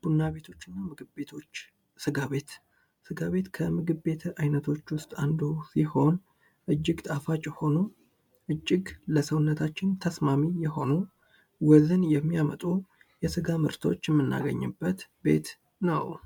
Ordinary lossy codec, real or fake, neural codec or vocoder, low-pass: MP3, 96 kbps; real; none; 19.8 kHz